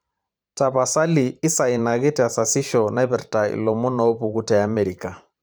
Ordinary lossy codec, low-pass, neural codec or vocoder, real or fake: none; none; vocoder, 44.1 kHz, 128 mel bands every 512 samples, BigVGAN v2; fake